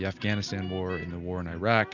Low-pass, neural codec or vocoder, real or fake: 7.2 kHz; none; real